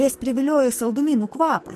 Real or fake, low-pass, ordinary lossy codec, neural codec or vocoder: fake; 14.4 kHz; MP3, 64 kbps; codec, 44.1 kHz, 2.6 kbps, SNAC